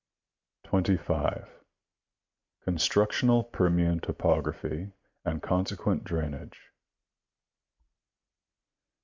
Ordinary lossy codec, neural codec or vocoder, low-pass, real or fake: MP3, 64 kbps; none; 7.2 kHz; real